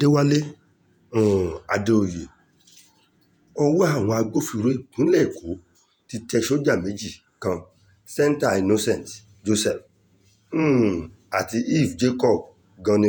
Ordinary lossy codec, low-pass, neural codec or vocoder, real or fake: none; none; vocoder, 48 kHz, 128 mel bands, Vocos; fake